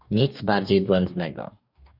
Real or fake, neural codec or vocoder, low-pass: fake; codec, 44.1 kHz, 2.6 kbps, DAC; 5.4 kHz